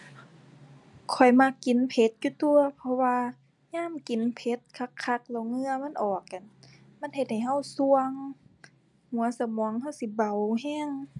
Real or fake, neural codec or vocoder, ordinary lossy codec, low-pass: real; none; none; 10.8 kHz